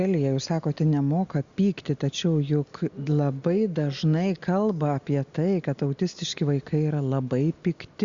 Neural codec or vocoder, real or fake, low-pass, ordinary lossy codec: none; real; 7.2 kHz; Opus, 64 kbps